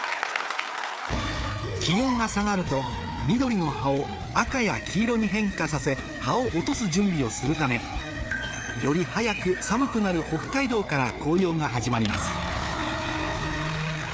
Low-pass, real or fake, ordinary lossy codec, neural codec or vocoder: none; fake; none; codec, 16 kHz, 4 kbps, FreqCodec, larger model